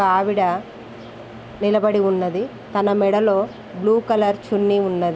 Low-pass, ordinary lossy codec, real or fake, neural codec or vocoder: none; none; real; none